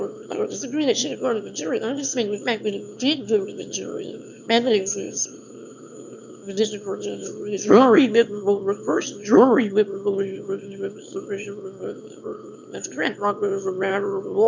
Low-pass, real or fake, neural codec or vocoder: 7.2 kHz; fake; autoencoder, 22.05 kHz, a latent of 192 numbers a frame, VITS, trained on one speaker